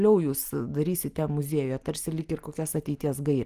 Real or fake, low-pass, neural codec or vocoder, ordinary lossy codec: real; 14.4 kHz; none; Opus, 24 kbps